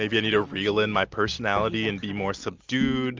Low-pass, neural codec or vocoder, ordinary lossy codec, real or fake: 7.2 kHz; none; Opus, 24 kbps; real